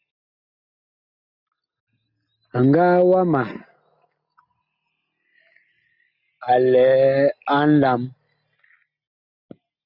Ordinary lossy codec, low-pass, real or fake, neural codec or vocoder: Opus, 64 kbps; 5.4 kHz; real; none